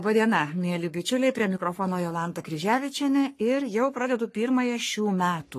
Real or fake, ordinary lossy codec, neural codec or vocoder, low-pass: fake; AAC, 48 kbps; codec, 44.1 kHz, 3.4 kbps, Pupu-Codec; 14.4 kHz